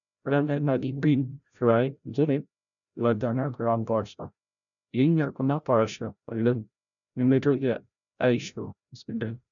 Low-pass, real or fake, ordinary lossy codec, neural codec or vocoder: 7.2 kHz; fake; none; codec, 16 kHz, 0.5 kbps, FreqCodec, larger model